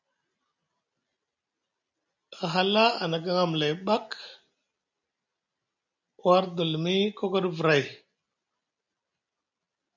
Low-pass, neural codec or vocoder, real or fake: 7.2 kHz; none; real